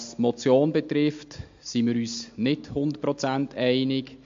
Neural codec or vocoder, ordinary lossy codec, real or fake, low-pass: none; MP3, 48 kbps; real; 7.2 kHz